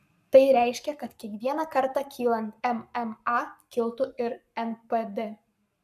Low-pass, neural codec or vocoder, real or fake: 14.4 kHz; codec, 44.1 kHz, 7.8 kbps, Pupu-Codec; fake